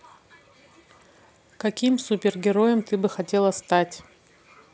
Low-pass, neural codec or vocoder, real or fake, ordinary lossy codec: none; none; real; none